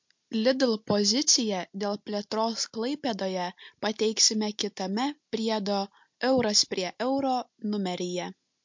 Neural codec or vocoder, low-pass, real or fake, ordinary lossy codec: none; 7.2 kHz; real; MP3, 48 kbps